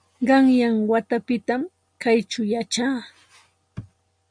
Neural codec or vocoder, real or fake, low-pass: none; real; 9.9 kHz